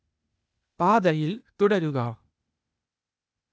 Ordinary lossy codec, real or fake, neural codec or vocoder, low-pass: none; fake; codec, 16 kHz, 0.8 kbps, ZipCodec; none